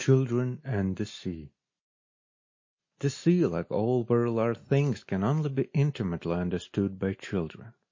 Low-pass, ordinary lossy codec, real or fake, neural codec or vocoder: 7.2 kHz; MP3, 32 kbps; real; none